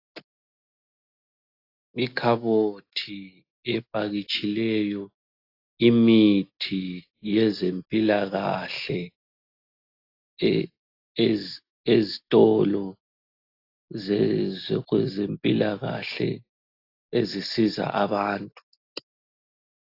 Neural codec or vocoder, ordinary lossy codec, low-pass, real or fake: none; AAC, 32 kbps; 5.4 kHz; real